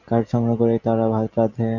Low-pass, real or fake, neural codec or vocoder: 7.2 kHz; real; none